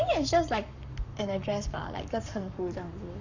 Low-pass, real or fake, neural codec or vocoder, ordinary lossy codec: 7.2 kHz; fake; codec, 44.1 kHz, 7.8 kbps, Pupu-Codec; none